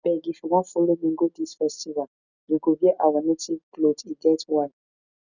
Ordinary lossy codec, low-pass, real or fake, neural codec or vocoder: none; 7.2 kHz; real; none